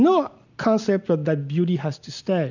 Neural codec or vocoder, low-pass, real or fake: none; 7.2 kHz; real